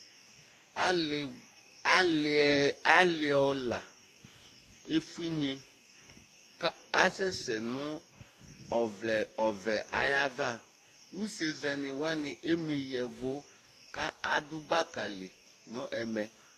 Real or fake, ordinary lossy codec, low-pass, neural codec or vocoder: fake; AAC, 64 kbps; 14.4 kHz; codec, 44.1 kHz, 2.6 kbps, DAC